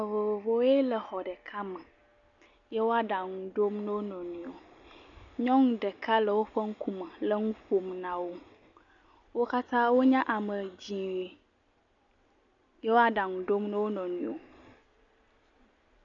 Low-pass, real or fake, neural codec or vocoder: 7.2 kHz; real; none